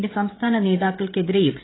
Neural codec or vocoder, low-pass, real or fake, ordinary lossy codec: codec, 16 kHz, 16 kbps, FreqCodec, smaller model; 7.2 kHz; fake; AAC, 16 kbps